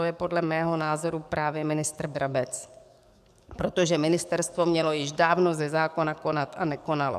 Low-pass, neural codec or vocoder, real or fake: 14.4 kHz; codec, 44.1 kHz, 7.8 kbps, DAC; fake